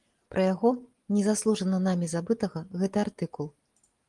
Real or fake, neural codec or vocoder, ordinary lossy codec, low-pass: real; none; Opus, 24 kbps; 10.8 kHz